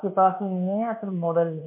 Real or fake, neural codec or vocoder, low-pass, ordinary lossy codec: fake; codec, 24 kHz, 1.2 kbps, DualCodec; 3.6 kHz; MP3, 32 kbps